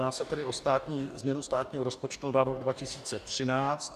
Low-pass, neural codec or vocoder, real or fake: 14.4 kHz; codec, 44.1 kHz, 2.6 kbps, DAC; fake